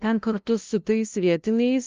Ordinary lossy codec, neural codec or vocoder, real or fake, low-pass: Opus, 32 kbps; codec, 16 kHz, 0.5 kbps, FunCodec, trained on LibriTTS, 25 frames a second; fake; 7.2 kHz